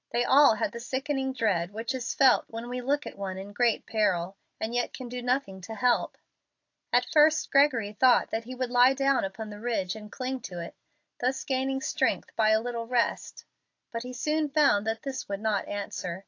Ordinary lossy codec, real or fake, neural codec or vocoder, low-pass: AAC, 48 kbps; real; none; 7.2 kHz